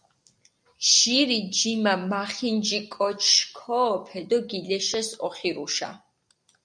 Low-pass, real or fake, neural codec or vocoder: 9.9 kHz; real; none